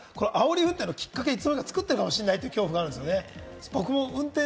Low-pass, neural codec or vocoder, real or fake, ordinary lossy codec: none; none; real; none